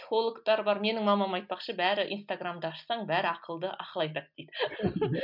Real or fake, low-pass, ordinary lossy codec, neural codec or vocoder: real; 5.4 kHz; none; none